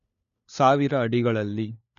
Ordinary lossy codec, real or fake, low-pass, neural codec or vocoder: none; fake; 7.2 kHz; codec, 16 kHz, 4 kbps, FunCodec, trained on LibriTTS, 50 frames a second